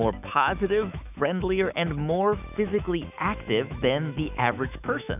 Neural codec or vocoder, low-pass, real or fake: autoencoder, 48 kHz, 128 numbers a frame, DAC-VAE, trained on Japanese speech; 3.6 kHz; fake